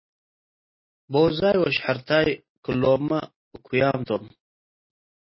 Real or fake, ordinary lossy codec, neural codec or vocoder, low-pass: real; MP3, 24 kbps; none; 7.2 kHz